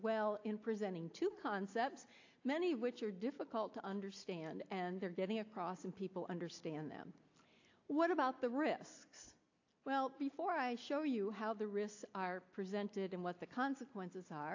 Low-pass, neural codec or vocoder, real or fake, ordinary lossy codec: 7.2 kHz; none; real; AAC, 48 kbps